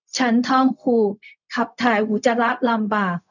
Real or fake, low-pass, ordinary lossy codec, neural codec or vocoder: fake; 7.2 kHz; none; codec, 16 kHz, 0.4 kbps, LongCat-Audio-Codec